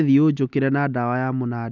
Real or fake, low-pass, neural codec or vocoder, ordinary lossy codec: real; 7.2 kHz; none; none